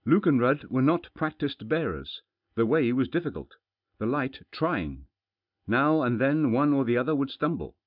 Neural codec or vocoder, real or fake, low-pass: none; real; 5.4 kHz